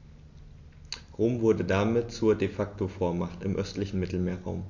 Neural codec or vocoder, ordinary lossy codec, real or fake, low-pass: none; AAC, 48 kbps; real; 7.2 kHz